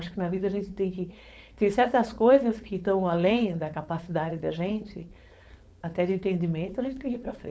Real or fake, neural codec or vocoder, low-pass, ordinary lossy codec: fake; codec, 16 kHz, 4.8 kbps, FACodec; none; none